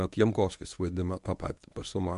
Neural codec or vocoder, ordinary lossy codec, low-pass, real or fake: codec, 24 kHz, 0.9 kbps, WavTokenizer, medium speech release version 1; MP3, 64 kbps; 10.8 kHz; fake